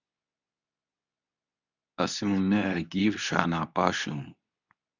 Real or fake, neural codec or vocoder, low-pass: fake; codec, 24 kHz, 0.9 kbps, WavTokenizer, medium speech release version 2; 7.2 kHz